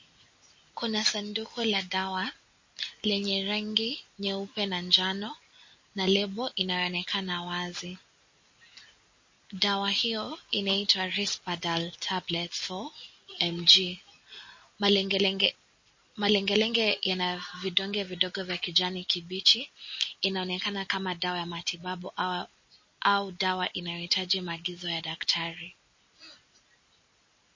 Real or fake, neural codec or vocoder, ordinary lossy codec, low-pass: real; none; MP3, 32 kbps; 7.2 kHz